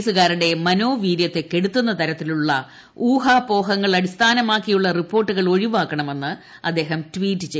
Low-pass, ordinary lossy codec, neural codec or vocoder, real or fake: none; none; none; real